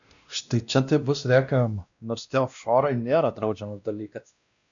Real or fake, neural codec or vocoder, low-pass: fake; codec, 16 kHz, 1 kbps, X-Codec, WavLM features, trained on Multilingual LibriSpeech; 7.2 kHz